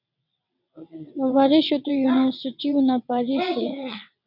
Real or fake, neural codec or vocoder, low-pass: fake; vocoder, 22.05 kHz, 80 mel bands, WaveNeXt; 5.4 kHz